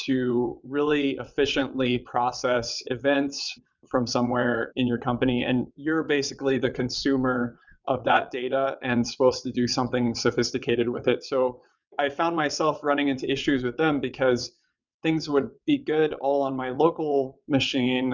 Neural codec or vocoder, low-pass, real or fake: vocoder, 22.05 kHz, 80 mel bands, WaveNeXt; 7.2 kHz; fake